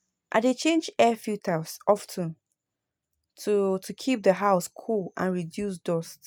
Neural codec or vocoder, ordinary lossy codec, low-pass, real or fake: none; none; none; real